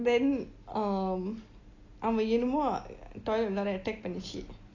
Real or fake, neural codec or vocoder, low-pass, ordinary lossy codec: real; none; 7.2 kHz; AAC, 32 kbps